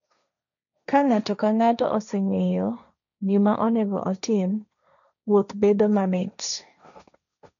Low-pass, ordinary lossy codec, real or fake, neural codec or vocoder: 7.2 kHz; none; fake; codec, 16 kHz, 1.1 kbps, Voila-Tokenizer